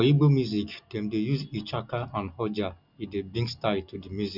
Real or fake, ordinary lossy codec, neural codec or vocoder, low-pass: real; none; none; 5.4 kHz